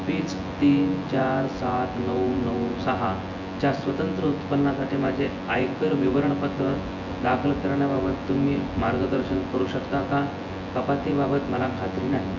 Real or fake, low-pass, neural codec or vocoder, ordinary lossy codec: fake; 7.2 kHz; vocoder, 24 kHz, 100 mel bands, Vocos; MP3, 48 kbps